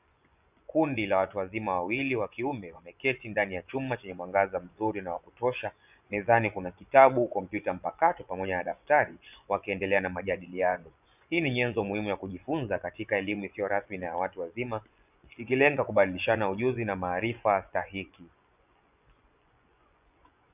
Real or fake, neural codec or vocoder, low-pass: fake; vocoder, 24 kHz, 100 mel bands, Vocos; 3.6 kHz